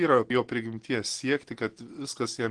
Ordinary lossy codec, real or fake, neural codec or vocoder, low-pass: Opus, 16 kbps; real; none; 9.9 kHz